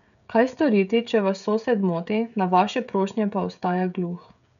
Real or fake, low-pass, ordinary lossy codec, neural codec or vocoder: fake; 7.2 kHz; none; codec, 16 kHz, 16 kbps, FreqCodec, smaller model